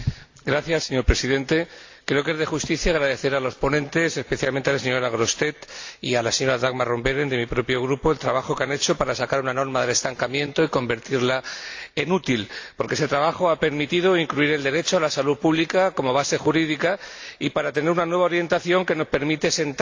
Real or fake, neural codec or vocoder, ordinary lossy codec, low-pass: real; none; AAC, 48 kbps; 7.2 kHz